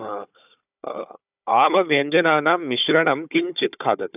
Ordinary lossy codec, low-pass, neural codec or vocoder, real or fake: none; 3.6 kHz; codec, 16 kHz, 4 kbps, FunCodec, trained on Chinese and English, 50 frames a second; fake